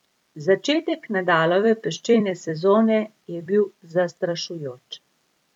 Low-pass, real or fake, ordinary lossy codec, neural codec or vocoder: 19.8 kHz; fake; none; vocoder, 44.1 kHz, 128 mel bands every 256 samples, BigVGAN v2